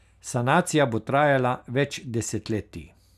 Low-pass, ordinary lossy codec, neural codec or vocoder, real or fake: 14.4 kHz; none; none; real